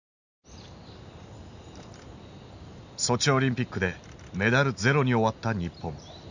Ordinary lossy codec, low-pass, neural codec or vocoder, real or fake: none; 7.2 kHz; none; real